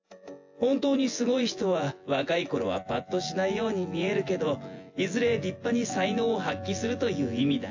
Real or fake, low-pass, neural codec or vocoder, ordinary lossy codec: fake; 7.2 kHz; vocoder, 24 kHz, 100 mel bands, Vocos; AAC, 48 kbps